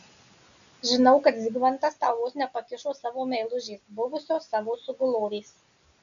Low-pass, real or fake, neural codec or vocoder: 7.2 kHz; real; none